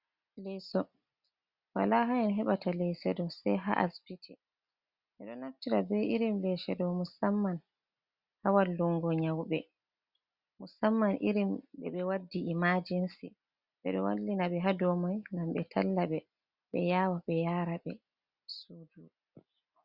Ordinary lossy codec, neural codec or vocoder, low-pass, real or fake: Opus, 64 kbps; none; 5.4 kHz; real